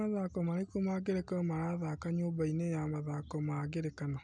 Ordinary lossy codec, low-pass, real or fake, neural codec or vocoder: none; 9.9 kHz; real; none